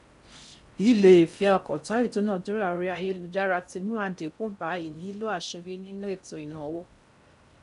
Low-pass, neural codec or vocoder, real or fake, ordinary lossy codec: 10.8 kHz; codec, 16 kHz in and 24 kHz out, 0.6 kbps, FocalCodec, streaming, 4096 codes; fake; none